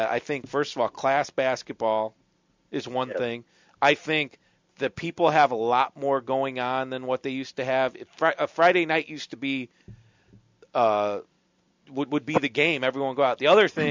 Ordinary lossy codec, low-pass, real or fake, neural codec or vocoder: MP3, 64 kbps; 7.2 kHz; real; none